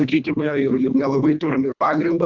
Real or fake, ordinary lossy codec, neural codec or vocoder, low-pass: fake; Opus, 64 kbps; codec, 24 kHz, 1.5 kbps, HILCodec; 7.2 kHz